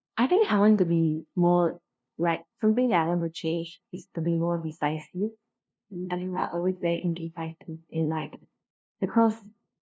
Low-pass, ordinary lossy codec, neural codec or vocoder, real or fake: none; none; codec, 16 kHz, 0.5 kbps, FunCodec, trained on LibriTTS, 25 frames a second; fake